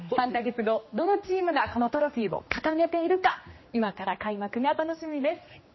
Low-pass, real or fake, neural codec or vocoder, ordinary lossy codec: 7.2 kHz; fake; codec, 16 kHz, 2 kbps, X-Codec, HuBERT features, trained on general audio; MP3, 24 kbps